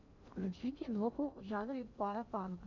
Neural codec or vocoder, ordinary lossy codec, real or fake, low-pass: codec, 16 kHz in and 24 kHz out, 0.8 kbps, FocalCodec, streaming, 65536 codes; MP3, 64 kbps; fake; 7.2 kHz